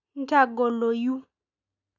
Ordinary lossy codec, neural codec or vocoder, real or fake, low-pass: none; none; real; 7.2 kHz